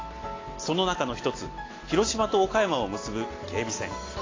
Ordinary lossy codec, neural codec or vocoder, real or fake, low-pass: AAC, 32 kbps; none; real; 7.2 kHz